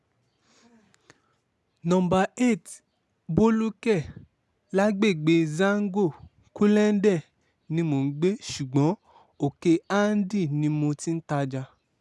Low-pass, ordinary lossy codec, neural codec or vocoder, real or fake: none; none; none; real